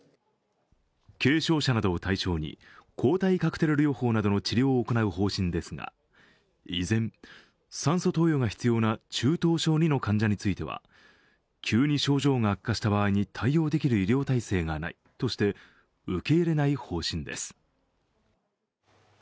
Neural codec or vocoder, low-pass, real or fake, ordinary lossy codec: none; none; real; none